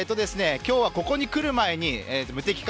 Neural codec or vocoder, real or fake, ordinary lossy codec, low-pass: none; real; none; none